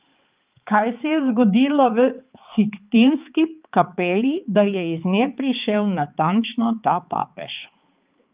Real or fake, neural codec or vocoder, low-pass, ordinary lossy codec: fake; codec, 16 kHz, 4 kbps, X-Codec, HuBERT features, trained on balanced general audio; 3.6 kHz; Opus, 24 kbps